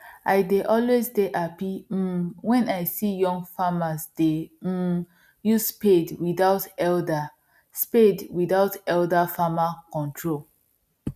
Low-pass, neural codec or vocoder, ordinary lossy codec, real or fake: 14.4 kHz; none; none; real